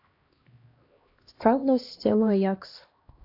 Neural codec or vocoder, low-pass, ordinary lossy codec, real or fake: codec, 16 kHz, 1 kbps, X-Codec, HuBERT features, trained on LibriSpeech; 5.4 kHz; AAC, 32 kbps; fake